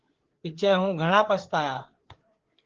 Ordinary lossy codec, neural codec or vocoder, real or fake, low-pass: Opus, 16 kbps; codec, 16 kHz, 4 kbps, FreqCodec, larger model; fake; 7.2 kHz